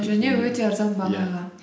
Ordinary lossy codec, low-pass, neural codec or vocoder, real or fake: none; none; none; real